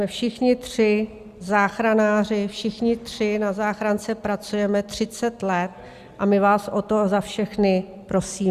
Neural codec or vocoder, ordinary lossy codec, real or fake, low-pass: none; Opus, 64 kbps; real; 14.4 kHz